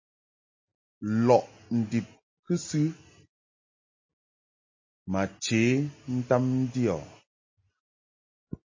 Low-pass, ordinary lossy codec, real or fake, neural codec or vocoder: 7.2 kHz; MP3, 32 kbps; real; none